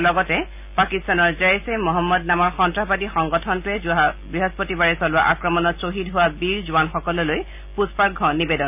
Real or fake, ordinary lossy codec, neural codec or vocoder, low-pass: real; none; none; 3.6 kHz